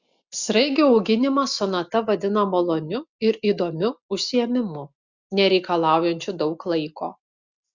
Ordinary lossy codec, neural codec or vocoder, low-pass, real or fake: Opus, 64 kbps; none; 7.2 kHz; real